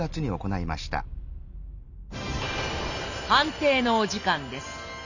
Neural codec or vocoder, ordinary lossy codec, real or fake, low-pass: none; none; real; 7.2 kHz